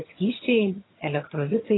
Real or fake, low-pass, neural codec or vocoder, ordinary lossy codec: fake; 7.2 kHz; vocoder, 22.05 kHz, 80 mel bands, HiFi-GAN; AAC, 16 kbps